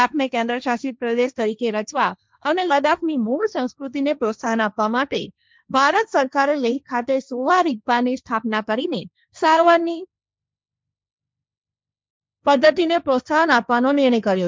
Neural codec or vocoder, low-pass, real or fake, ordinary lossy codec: codec, 16 kHz, 1.1 kbps, Voila-Tokenizer; none; fake; none